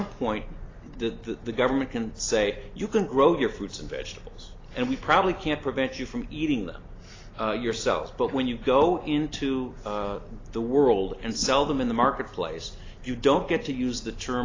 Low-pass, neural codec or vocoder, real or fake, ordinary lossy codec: 7.2 kHz; none; real; AAC, 32 kbps